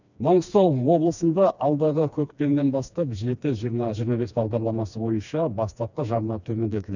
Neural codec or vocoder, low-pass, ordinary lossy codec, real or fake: codec, 16 kHz, 2 kbps, FreqCodec, smaller model; 7.2 kHz; none; fake